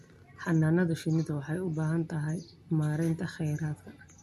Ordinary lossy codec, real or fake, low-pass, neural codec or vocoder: MP3, 64 kbps; real; 14.4 kHz; none